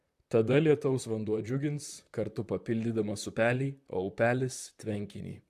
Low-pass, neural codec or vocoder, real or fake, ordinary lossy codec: 14.4 kHz; vocoder, 44.1 kHz, 128 mel bands, Pupu-Vocoder; fake; AAC, 96 kbps